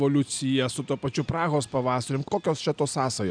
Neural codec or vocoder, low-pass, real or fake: none; 9.9 kHz; real